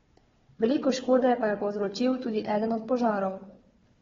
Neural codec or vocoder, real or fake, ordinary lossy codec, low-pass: codec, 16 kHz, 4 kbps, FunCodec, trained on Chinese and English, 50 frames a second; fake; AAC, 24 kbps; 7.2 kHz